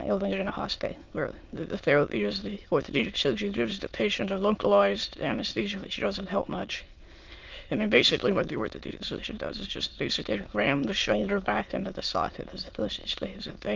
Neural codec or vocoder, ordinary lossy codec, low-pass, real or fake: autoencoder, 22.05 kHz, a latent of 192 numbers a frame, VITS, trained on many speakers; Opus, 32 kbps; 7.2 kHz; fake